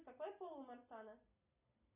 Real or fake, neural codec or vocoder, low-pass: real; none; 3.6 kHz